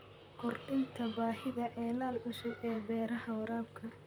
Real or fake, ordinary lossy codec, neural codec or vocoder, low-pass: fake; none; vocoder, 44.1 kHz, 128 mel bands, Pupu-Vocoder; none